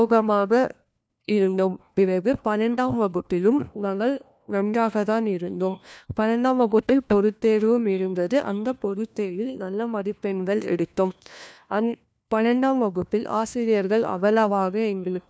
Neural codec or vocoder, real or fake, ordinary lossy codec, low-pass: codec, 16 kHz, 1 kbps, FunCodec, trained on LibriTTS, 50 frames a second; fake; none; none